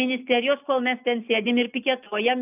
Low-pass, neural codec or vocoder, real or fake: 3.6 kHz; none; real